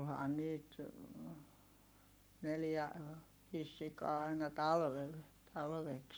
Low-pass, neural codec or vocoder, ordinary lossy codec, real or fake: none; codec, 44.1 kHz, 7.8 kbps, Pupu-Codec; none; fake